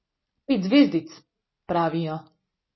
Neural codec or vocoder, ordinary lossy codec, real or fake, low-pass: none; MP3, 24 kbps; real; 7.2 kHz